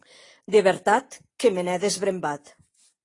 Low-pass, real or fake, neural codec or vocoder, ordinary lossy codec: 10.8 kHz; real; none; AAC, 32 kbps